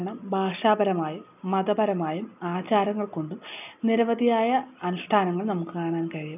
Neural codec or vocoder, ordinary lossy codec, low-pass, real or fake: none; none; 3.6 kHz; real